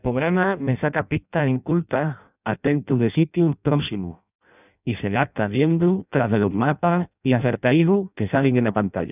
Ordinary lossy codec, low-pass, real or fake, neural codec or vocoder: none; 3.6 kHz; fake; codec, 16 kHz in and 24 kHz out, 0.6 kbps, FireRedTTS-2 codec